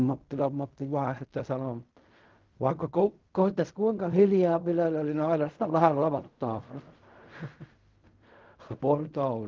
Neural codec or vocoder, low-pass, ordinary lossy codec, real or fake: codec, 16 kHz in and 24 kHz out, 0.4 kbps, LongCat-Audio-Codec, fine tuned four codebook decoder; 7.2 kHz; Opus, 32 kbps; fake